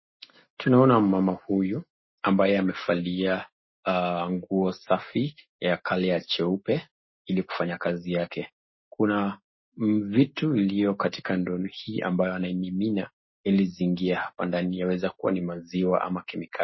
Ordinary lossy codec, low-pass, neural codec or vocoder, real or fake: MP3, 24 kbps; 7.2 kHz; none; real